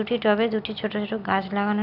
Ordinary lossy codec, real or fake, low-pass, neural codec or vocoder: none; real; 5.4 kHz; none